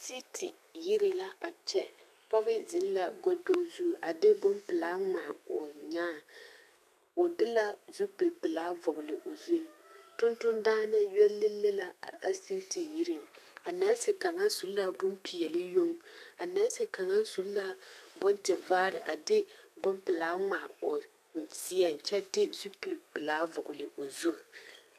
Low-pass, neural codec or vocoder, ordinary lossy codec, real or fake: 14.4 kHz; codec, 32 kHz, 1.9 kbps, SNAC; AAC, 96 kbps; fake